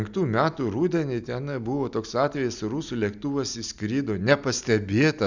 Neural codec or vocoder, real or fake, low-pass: none; real; 7.2 kHz